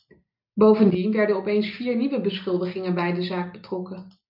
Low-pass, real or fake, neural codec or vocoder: 5.4 kHz; real; none